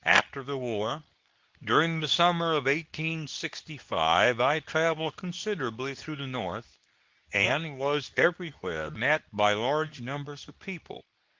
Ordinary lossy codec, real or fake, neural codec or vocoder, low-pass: Opus, 24 kbps; fake; codec, 24 kHz, 0.9 kbps, WavTokenizer, medium speech release version 2; 7.2 kHz